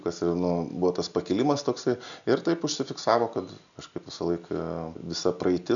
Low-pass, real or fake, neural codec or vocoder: 7.2 kHz; real; none